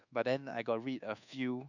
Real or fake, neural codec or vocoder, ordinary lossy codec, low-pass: fake; codec, 24 kHz, 1.2 kbps, DualCodec; none; 7.2 kHz